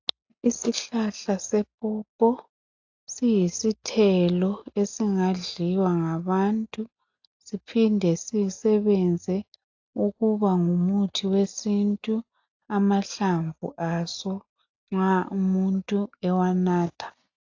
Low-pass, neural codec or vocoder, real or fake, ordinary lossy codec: 7.2 kHz; none; real; AAC, 48 kbps